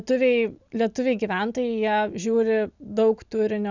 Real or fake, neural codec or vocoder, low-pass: real; none; 7.2 kHz